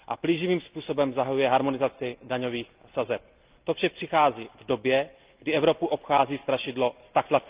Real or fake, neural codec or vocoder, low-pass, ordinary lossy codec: real; none; 3.6 kHz; Opus, 24 kbps